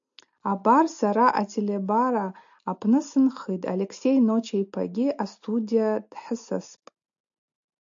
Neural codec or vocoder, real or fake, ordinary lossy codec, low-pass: none; real; AAC, 64 kbps; 7.2 kHz